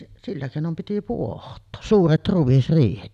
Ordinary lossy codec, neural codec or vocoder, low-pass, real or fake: none; none; 14.4 kHz; real